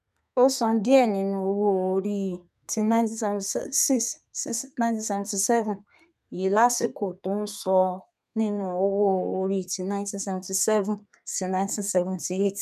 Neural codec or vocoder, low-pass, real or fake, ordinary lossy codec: codec, 32 kHz, 1.9 kbps, SNAC; 14.4 kHz; fake; none